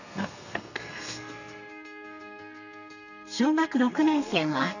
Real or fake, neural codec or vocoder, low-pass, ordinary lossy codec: fake; codec, 32 kHz, 1.9 kbps, SNAC; 7.2 kHz; none